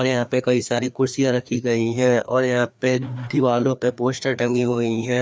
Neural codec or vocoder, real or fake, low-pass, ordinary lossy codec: codec, 16 kHz, 2 kbps, FreqCodec, larger model; fake; none; none